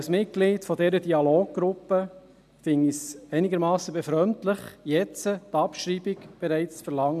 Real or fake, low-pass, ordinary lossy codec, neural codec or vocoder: real; 14.4 kHz; none; none